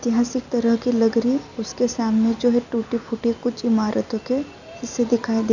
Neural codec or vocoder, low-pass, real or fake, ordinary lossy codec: none; 7.2 kHz; real; none